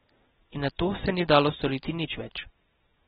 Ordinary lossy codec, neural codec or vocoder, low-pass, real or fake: AAC, 16 kbps; none; 7.2 kHz; real